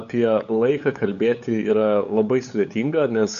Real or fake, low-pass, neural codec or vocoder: fake; 7.2 kHz; codec, 16 kHz, 8 kbps, FunCodec, trained on LibriTTS, 25 frames a second